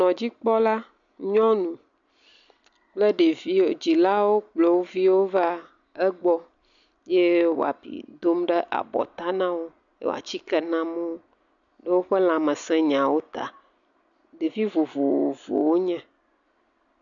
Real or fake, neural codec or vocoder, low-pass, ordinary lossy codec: real; none; 7.2 kHz; MP3, 96 kbps